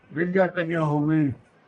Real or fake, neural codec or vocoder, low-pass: fake; codec, 44.1 kHz, 1.7 kbps, Pupu-Codec; 10.8 kHz